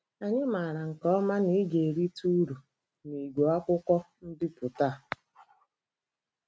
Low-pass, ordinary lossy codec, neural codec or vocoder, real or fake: none; none; none; real